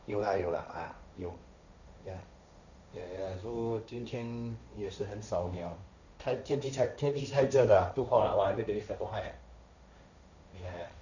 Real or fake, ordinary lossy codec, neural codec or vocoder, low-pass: fake; none; codec, 16 kHz, 1.1 kbps, Voila-Tokenizer; 7.2 kHz